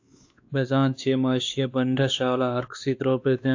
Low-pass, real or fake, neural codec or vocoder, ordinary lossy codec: 7.2 kHz; fake; codec, 16 kHz, 2 kbps, X-Codec, WavLM features, trained on Multilingual LibriSpeech; AAC, 48 kbps